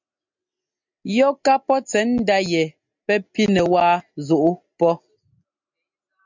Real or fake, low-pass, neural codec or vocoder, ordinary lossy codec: real; 7.2 kHz; none; MP3, 64 kbps